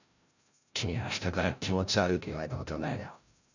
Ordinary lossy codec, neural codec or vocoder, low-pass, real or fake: none; codec, 16 kHz, 0.5 kbps, FreqCodec, larger model; 7.2 kHz; fake